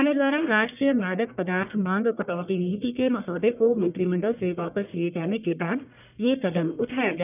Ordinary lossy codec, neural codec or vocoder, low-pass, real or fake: none; codec, 44.1 kHz, 1.7 kbps, Pupu-Codec; 3.6 kHz; fake